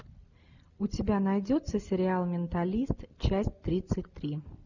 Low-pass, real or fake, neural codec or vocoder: 7.2 kHz; real; none